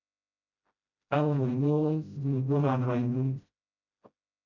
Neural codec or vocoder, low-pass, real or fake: codec, 16 kHz, 0.5 kbps, FreqCodec, smaller model; 7.2 kHz; fake